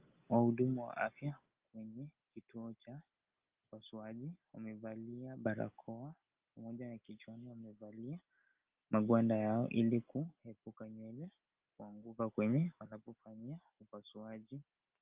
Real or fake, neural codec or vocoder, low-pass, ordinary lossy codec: real; none; 3.6 kHz; Opus, 24 kbps